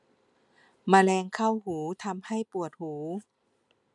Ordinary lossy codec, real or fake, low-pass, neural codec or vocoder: none; real; 10.8 kHz; none